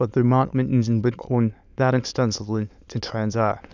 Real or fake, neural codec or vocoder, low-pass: fake; autoencoder, 22.05 kHz, a latent of 192 numbers a frame, VITS, trained on many speakers; 7.2 kHz